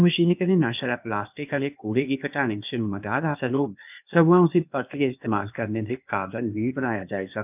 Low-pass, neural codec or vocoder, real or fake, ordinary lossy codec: 3.6 kHz; codec, 16 kHz, 0.8 kbps, ZipCodec; fake; none